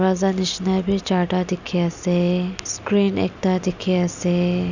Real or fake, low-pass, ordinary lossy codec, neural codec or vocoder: real; 7.2 kHz; none; none